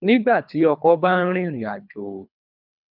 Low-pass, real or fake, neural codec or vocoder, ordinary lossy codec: 5.4 kHz; fake; codec, 24 kHz, 3 kbps, HILCodec; none